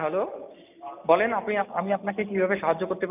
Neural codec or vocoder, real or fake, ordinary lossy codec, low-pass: none; real; none; 3.6 kHz